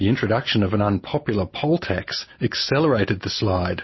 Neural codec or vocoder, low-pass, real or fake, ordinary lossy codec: none; 7.2 kHz; real; MP3, 24 kbps